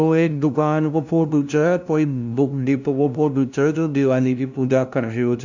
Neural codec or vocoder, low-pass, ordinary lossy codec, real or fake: codec, 16 kHz, 0.5 kbps, FunCodec, trained on LibriTTS, 25 frames a second; 7.2 kHz; none; fake